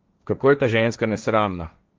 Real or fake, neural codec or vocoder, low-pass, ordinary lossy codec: fake; codec, 16 kHz, 1.1 kbps, Voila-Tokenizer; 7.2 kHz; Opus, 24 kbps